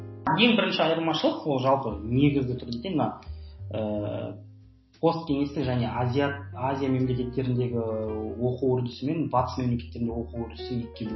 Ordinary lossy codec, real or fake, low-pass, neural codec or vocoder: MP3, 24 kbps; real; 7.2 kHz; none